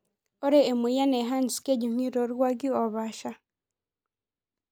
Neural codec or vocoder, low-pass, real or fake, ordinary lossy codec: none; none; real; none